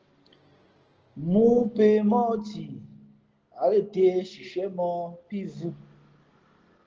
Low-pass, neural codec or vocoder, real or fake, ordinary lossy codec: 7.2 kHz; none; real; Opus, 16 kbps